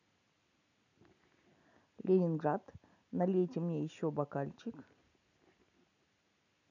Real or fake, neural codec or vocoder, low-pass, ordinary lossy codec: real; none; 7.2 kHz; none